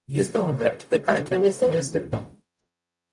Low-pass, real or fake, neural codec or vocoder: 10.8 kHz; fake; codec, 44.1 kHz, 0.9 kbps, DAC